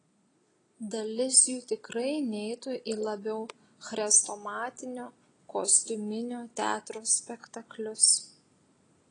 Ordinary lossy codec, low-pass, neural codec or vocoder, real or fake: AAC, 32 kbps; 9.9 kHz; none; real